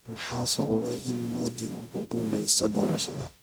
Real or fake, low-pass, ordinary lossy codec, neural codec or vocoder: fake; none; none; codec, 44.1 kHz, 0.9 kbps, DAC